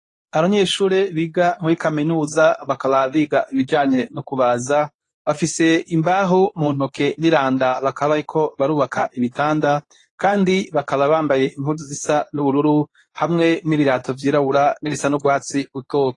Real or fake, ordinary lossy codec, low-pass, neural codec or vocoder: fake; AAC, 32 kbps; 10.8 kHz; codec, 24 kHz, 0.9 kbps, WavTokenizer, medium speech release version 2